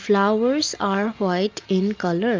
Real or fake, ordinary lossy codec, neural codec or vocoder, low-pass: real; Opus, 24 kbps; none; 7.2 kHz